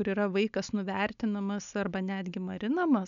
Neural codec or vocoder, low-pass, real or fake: none; 7.2 kHz; real